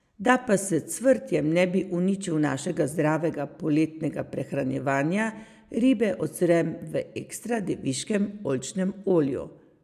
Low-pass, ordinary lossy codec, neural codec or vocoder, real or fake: 14.4 kHz; MP3, 96 kbps; none; real